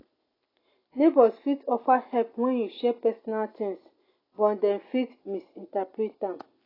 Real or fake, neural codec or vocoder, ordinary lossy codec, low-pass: real; none; AAC, 24 kbps; 5.4 kHz